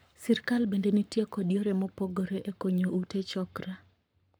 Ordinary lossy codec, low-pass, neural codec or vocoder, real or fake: none; none; codec, 44.1 kHz, 7.8 kbps, Pupu-Codec; fake